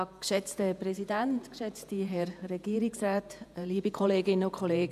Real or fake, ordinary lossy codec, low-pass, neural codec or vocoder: fake; none; 14.4 kHz; vocoder, 48 kHz, 128 mel bands, Vocos